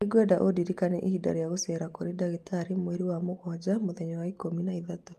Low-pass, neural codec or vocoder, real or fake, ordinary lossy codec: 14.4 kHz; none; real; Opus, 32 kbps